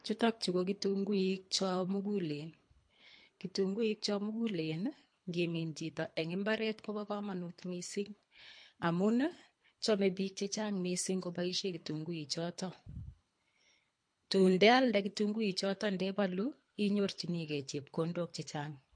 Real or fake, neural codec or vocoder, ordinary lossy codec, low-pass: fake; codec, 24 kHz, 3 kbps, HILCodec; MP3, 48 kbps; 9.9 kHz